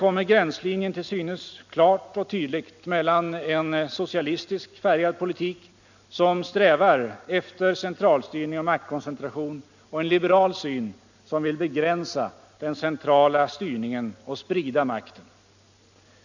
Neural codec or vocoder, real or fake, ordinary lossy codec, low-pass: none; real; none; 7.2 kHz